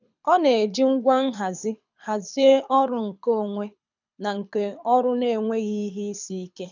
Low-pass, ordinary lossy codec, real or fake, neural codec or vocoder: 7.2 kHz; none; fake; codec, 24 kHz, 6 kbps, HILCodec